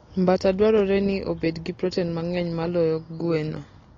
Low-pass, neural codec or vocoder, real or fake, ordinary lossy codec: 7.2 kHz; none; real; AAC, 32 kbps